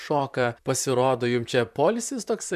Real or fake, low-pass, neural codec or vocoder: fake; 14.4 kHz; vocoder, 44.1 kHz, 128 mel bands, Pupu-Vocoder